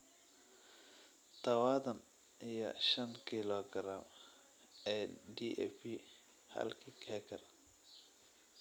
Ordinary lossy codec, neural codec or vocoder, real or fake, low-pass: none; none; real; none